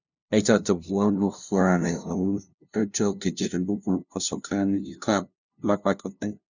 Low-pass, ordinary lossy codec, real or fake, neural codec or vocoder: 7.2 kHz; none; fake; codec, 16 kHz, 0.5 kbps, FunCodec, trained on LibriTTS, 25 frames a second